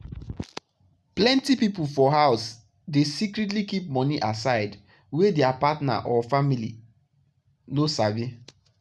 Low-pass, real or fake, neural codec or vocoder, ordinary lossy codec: none; real; none; none